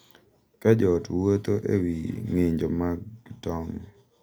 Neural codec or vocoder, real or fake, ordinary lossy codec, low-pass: none; real; none; none